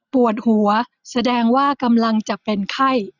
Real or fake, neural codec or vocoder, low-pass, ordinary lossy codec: real; none; 7.2 kHz; none